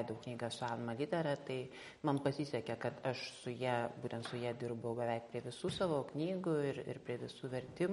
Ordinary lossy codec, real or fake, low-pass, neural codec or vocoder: MP3, 48 kbps; real; 19.8 kHz; none